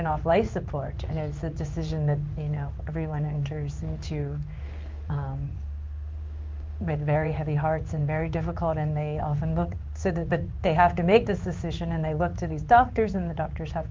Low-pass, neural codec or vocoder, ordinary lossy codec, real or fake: 7.2 kHz; codec, 16 kHz in and 24 kHz out, 1 kbps, XY-Tokenizer; Opus, 32 kbps; fake